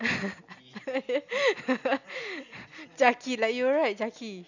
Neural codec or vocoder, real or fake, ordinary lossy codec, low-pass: none; real; none; 7.2 kHz